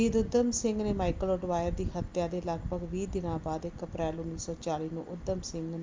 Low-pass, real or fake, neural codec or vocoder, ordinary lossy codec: 7.2 kHz; real; none; Opus, 24 kbps